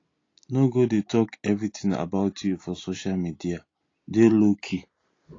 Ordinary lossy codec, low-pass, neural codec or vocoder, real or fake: AAC, 32 kbps; 7.2 kHz; none; real